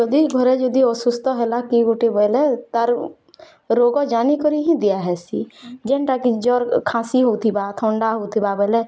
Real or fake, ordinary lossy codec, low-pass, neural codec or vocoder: real; none; none; none